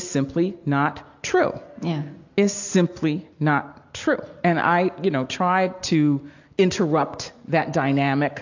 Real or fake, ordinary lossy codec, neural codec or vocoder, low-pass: real; AAC, 48 kbps; none; 7.2 kHz